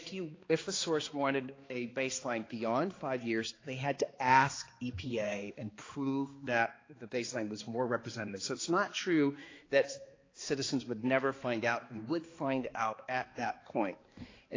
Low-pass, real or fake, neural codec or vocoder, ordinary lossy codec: 7.2 kHz; fake; codec, 16 kHz, 2 kbps, X-Codec, HuBERT features, trained on balanced general audio; AAC, 32 kbps